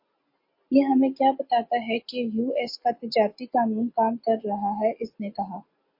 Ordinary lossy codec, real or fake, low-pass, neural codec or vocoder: MP3, 32 kbps; real; 5.4 kHz; none